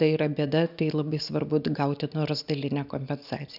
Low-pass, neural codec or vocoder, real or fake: 5.4 kHz; none; real